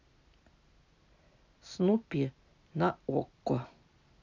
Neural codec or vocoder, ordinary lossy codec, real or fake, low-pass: none; none; real; 7.2 kHz